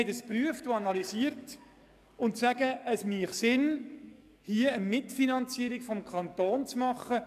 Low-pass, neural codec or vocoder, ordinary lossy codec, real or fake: 14.4 kHz; codec, 44.1 kHz, 7.8 kbps, DAC; none; fake